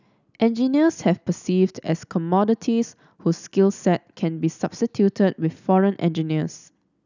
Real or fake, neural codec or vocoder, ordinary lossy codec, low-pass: real; none; none; 7.2 kHz